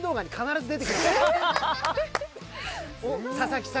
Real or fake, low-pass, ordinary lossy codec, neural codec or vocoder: real; none; none; none